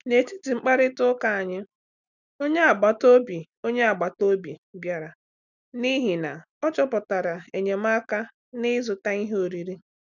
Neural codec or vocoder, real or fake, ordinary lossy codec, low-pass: none; real; none; 7.2 kHz